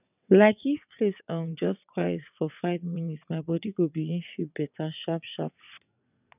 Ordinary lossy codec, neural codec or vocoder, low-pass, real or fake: none; vocoder, 22.05 kHz, 80 mel bands, WaveNeXt; 3.6 kHz; fake